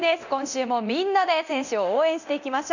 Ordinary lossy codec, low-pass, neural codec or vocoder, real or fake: none; 7.2 kHz; codec, 24 kHz, 0.9 kbps, DualCodec; fake